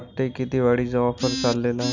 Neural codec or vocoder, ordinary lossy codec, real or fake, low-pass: none; none; real; 7.2 kHz